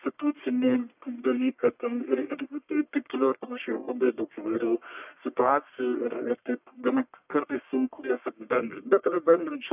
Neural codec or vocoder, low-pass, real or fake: codec, 44.1 kHz, 1.7 kbps, Pupu-Codec; 3.6 kHz; fake